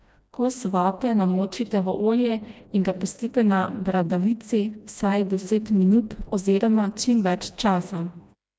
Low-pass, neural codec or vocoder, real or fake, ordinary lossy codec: none; codec, 16 kHz, 1 kbps, FreqCodec, smaller model; fake; none